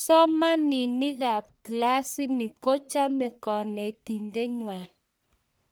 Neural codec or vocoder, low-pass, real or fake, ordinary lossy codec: codec, 44.1 kHz, 1.7 kbps, Pupu-Codec; none; fake; none